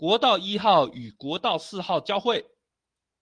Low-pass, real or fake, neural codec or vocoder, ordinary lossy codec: 9.9 kHz; real; none; Opus, 24 kbps